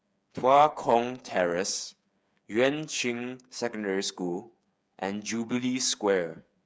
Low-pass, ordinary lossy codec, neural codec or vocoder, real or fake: none; none; codec, 16 kHz, 8 kbps, FreqCodec, smaller model; fake